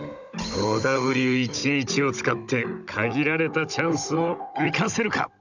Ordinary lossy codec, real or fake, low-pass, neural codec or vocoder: none; fake; 7.2 kHz; codec, 16 kHz, 16 kbps, FunCodec, trained on Chinese and English, 50 frames a second